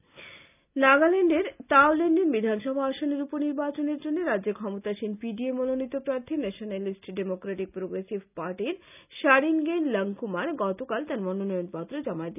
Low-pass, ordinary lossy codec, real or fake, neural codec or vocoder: 3.6 kHz; none; real; none